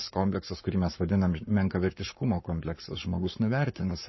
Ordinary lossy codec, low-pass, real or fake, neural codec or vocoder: MP3, 24 kbps; 7.2 kHz; fake; codec, 44.1 kHz, 7.8 kbps, Pupu-Codec